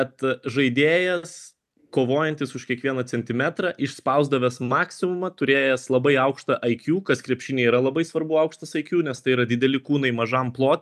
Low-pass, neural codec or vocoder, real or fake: 14.4 kHz; none; real